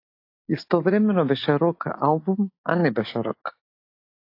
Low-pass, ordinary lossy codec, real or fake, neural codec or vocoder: 5.4 kHz; AAC, 32 kbps; real; none